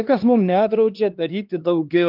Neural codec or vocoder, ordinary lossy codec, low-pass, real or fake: codec, 16 kHz, 2 kbps, X-Codec, HuBERT features, trained on LibriSpeech; Opus, 32 kbps; 5.4 kHz; fake